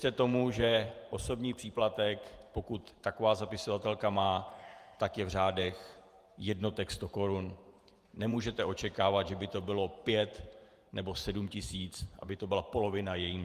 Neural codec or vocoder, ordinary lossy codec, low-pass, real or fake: vocoder, 48 kHz, 128 mel bands, Vocos; Opus, 32 kbps; 14.4 kHz; fake